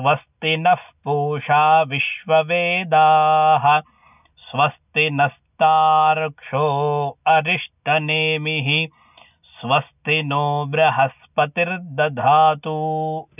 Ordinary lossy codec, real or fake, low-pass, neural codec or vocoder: none; real; 3.6 kHz; none